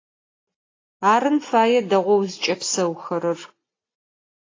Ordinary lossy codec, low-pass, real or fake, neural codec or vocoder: AAC, 32 kbps; 7.2 kHz; real; none